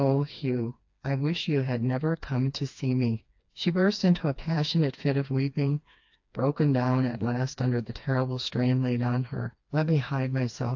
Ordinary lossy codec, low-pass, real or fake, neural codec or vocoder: AAC, 48 kbps; 7.2 kHz; fake; codec, 16 kHz, 2 kbps, FreqCodec, smaller model